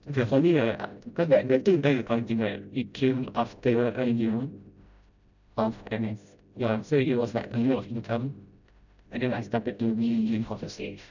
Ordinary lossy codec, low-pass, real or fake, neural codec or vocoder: none; 7.2 kHz; fake; codec, 16 kHz, 0.5 kbps, FreqCodec, smaller model